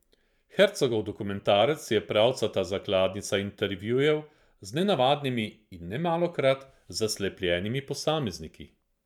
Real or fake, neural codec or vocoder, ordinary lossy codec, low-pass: fake; vocoder, 44.1 kHz, 128 mel bands every 512 samples, BigVGAN v2; none; 19.8 kHz